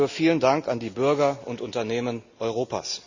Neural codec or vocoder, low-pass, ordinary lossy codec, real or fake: none; 7.2 kHz; Opus, 64 kbps; real